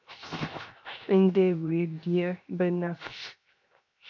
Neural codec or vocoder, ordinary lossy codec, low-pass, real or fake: codec, 16 kHz, 0.7 kbps, FocalCodec; MP3, 48 kbps; 7.2 kHz; fake